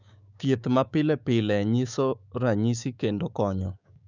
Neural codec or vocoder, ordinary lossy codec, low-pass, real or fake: codec, 16 kHz, 6 kbps, DAC; none; 7.2 kHz; fake